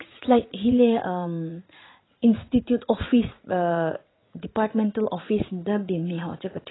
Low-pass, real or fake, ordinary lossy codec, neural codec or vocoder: 7.2 kHz; fake; AAC, 16 kbps; vocoder, 44.1 kHz, 80 mel bands, Vocos